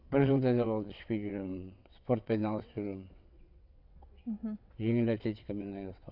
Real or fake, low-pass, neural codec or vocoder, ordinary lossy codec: fake; 5.4 kHz; vocoder, 22.05 kHz, 80 mel bands, WaveNeXt; none